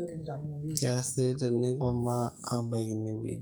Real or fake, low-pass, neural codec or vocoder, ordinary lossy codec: fake; none; codec, 44.1 kHz, 2.6 kbps, SNAC; none